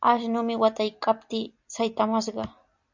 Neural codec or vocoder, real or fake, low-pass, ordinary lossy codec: none; real; 7.2 kHz; MP3, 64 kbps